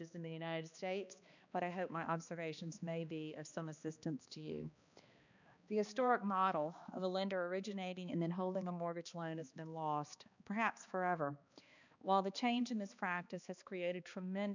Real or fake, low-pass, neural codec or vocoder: fake; 7.2 kHz; codec, 16 kHz, 2 kbps, X-Codec, HuBERT features, trained on balanced general audio